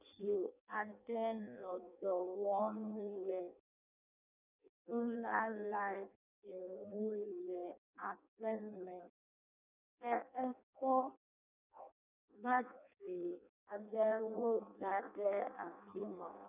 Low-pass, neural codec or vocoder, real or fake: 3.6 kHz; codec, 16 kHz in and 24 kHz out, 0.6 kbps, FireRedTTS-2 codec; fake